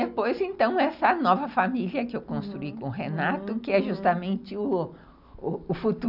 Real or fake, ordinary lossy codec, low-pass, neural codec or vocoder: real; none; 5.4 kHz; none